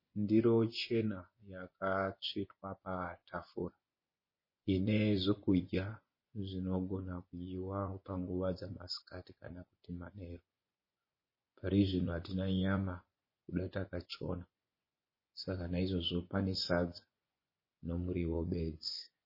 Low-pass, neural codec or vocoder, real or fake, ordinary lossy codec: 5.4 kHz; vocoder, 24 kHz, 100 mel bands, Vocos; fake; MP3, 24 kbps